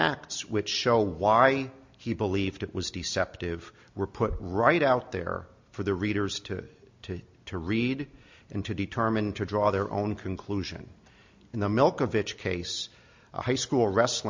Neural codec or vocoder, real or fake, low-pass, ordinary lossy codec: none; real; 7.2 kHz; MP3, 48 kbps